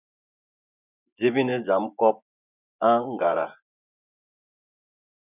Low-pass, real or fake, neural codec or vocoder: 3.6 kHz; fake; vocoder, 24 kHz, 100 mel bands, Vocos